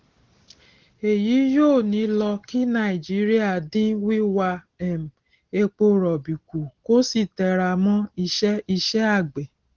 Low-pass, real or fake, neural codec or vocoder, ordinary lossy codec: 7.2 kHz; real; none; Opus, 16 kbps